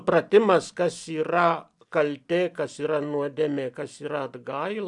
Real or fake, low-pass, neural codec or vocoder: real; 10.8 kHz; none